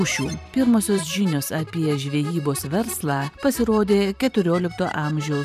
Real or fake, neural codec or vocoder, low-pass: fake; vocoder, 44.1 kHz, 128 mel bands every 512 samples, BigVGAN v2; 14.4 kHz